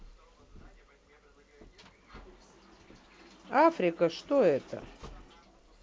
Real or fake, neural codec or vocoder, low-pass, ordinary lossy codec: real; none; none; none